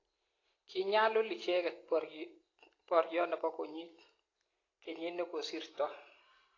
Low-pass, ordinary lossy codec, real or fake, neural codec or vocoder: 7.2 kHz; AAC, 32 kbps; real; none